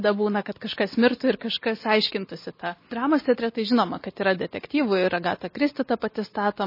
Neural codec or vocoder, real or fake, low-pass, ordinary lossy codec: none; real; 5.4 kHz; MP3, 24 kbps